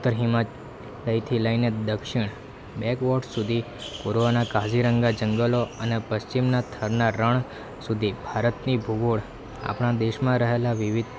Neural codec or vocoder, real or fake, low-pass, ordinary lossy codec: none; real; none; none